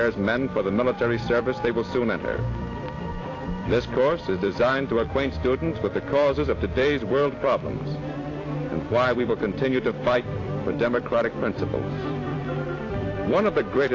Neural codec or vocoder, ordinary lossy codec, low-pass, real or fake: vocoder, 44.1 kHz, 128 mel bands every 256 samples, BigVGAN v2; AAC, 32 kbps; 7.2 kHz; fake